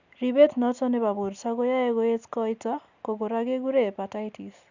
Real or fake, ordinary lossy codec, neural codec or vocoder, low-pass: real; Opus, 64 kbps; none; 7.2 kHz